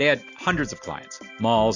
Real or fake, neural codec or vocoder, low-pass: real; none; 7.2 kHz